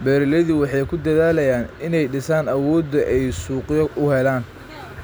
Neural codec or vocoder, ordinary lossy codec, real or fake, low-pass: none; none; real; none